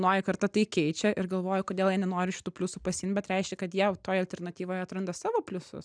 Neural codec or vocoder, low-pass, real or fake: none; 9.9 kHz; real